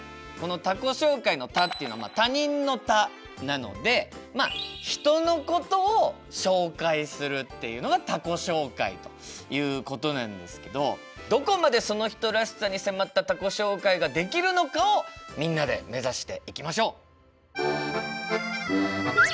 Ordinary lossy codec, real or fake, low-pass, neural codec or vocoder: none; real; none; none